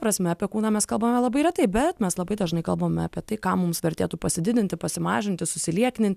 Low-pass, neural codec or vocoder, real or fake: 14.4 kHz; none; real